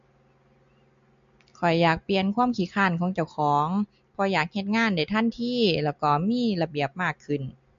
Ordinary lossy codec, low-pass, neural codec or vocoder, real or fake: MP3, 48 kbps; 7.2 kHz; none; real